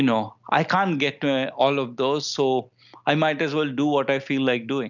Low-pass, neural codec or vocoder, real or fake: 7.2 kHz; none; real